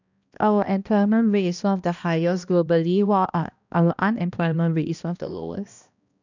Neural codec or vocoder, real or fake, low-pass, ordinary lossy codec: codec, 16 kHz, 1 kbps, X-Codec, HuBERT features, trained on balanced general audio; fake; 7.2 kHz; none